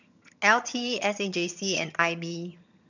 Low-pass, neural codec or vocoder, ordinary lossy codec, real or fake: 7.2 kHz; vocoder, 22.05 kHz, 80 mel bands, HiFi-GAN; none; fake